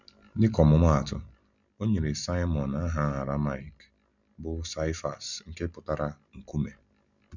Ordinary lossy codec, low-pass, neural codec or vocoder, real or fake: none; 7.2 kHz; none; real